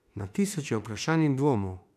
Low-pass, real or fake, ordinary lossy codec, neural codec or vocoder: 14.4 kHz; fake; none; autoencoder, 48 kHz, 32 numbers a frame, DAC-VAE, trained on Japanese speech